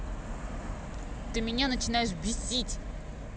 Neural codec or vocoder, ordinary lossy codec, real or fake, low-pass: none; none; real; none